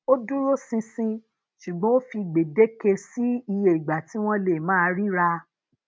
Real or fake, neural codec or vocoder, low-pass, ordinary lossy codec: real; none; none; none